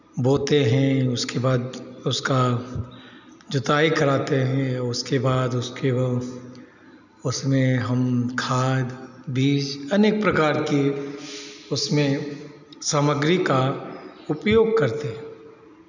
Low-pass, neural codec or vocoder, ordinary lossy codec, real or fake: 7.2 kHz; none; none; real